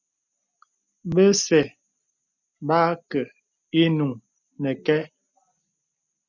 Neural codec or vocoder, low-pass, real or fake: none; 7.2 kHz; real